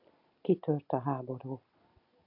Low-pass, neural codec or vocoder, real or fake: 5.4 kHz; none; real